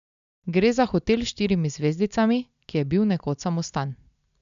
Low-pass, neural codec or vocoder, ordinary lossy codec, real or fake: 7.2 kHz; none; AAC, 96 kbps; real